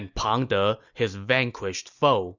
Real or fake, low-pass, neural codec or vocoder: real; 7.2 kHz; none